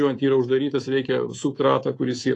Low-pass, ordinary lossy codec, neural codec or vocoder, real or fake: 10.8 kHz; AAC, 32 kbps; codec, 24 kHz, 3.1 kbps, DualCodec; fake